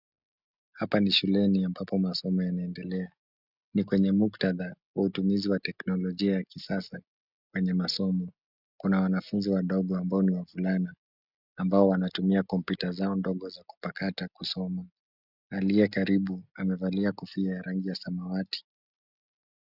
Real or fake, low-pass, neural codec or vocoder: real; 5.4 kHz; none